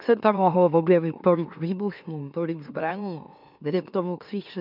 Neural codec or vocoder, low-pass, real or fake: autoencoder, 44.1 kHz, a latent of 192 numbers a frame, MeloTTS; 5.4 kHz; fake